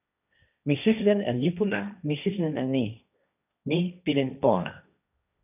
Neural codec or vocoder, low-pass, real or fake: codec, 16 kHz, 1.1 kbps, Voila-Tokenizer; 3.6 kHz; fake